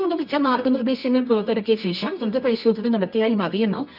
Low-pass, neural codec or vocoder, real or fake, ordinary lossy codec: 5.4 kHz; codec, 24 kHz, 0.9 kbps, WavTokenizer, medium music audio release; fake; none